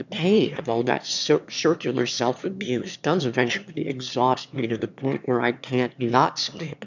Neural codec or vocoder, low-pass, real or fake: autoencoder, 22.05 kHz, a latent of 192 numbers a frame, VITS, trained on one speaker; 7.2 kHz; fake